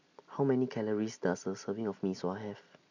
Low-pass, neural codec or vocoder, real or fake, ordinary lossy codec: 7.2 kHz; none; real; none